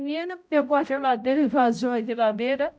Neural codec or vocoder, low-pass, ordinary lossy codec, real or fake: codec, 16 kHz, 0.5 kbps, X-Codec, HuBERT features, trained on balanced general audio; none; none; fake